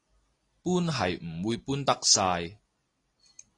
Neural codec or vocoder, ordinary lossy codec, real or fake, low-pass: none; AAC, 32 kbps; real; 10.8 kHz